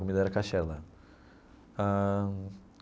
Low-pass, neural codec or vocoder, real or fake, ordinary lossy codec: none; none; real; none